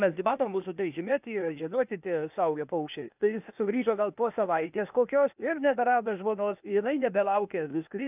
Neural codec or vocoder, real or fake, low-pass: codec, 16 kHz, 0.8 kbps, ZipCodec; fake; 3.6 kHz